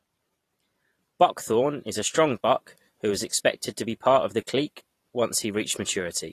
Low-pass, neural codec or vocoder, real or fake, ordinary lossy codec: 14.4 kHz; vocoder, 44.1 kHz, 128 mel bands every 512 samples, BigVGAN v2; fake; AAC, 48 kbps